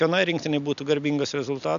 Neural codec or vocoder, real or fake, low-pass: none; real; 7.2 kHz